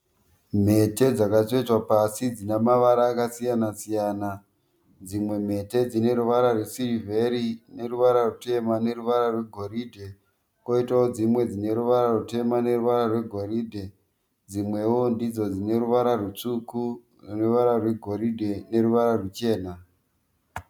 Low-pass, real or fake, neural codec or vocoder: 19.8 kHz; real; none